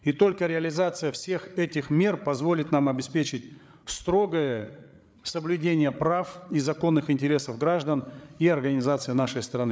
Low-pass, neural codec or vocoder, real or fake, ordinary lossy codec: none; codec, 16 kHz, 8 kbps, FreqCodec, larger model; fake; none